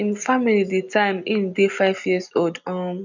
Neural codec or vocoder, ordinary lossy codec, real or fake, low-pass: none; none; real; 7.2 kHz